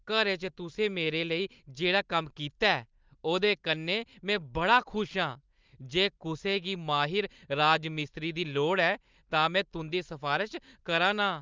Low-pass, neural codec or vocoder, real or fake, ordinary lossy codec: 7.2 kHz; none; real; Opus, 32 kbps